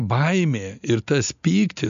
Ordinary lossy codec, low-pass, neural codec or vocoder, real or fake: MP3, 64 kbps; 7.2 kHz; none; real